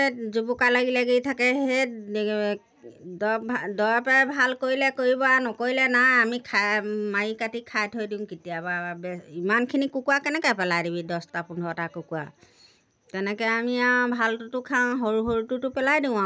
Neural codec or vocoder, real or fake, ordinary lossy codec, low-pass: none; real; none; none